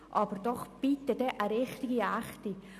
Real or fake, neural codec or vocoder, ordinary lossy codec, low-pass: real; none; none; 14.4 kHz